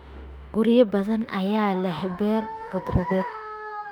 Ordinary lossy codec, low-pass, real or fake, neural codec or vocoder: none; 19.8 kHz; fake; autoencoder, 48 kHz, 32 numbers a frame, DAC-VAE, trained on Japanese speech